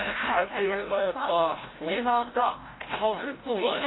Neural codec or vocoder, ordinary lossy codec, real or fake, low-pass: codec, 16 kHz, 0.5 kbps, FreqCodec, larger model; AAC, 16 kbps; fake; 7.2 kHz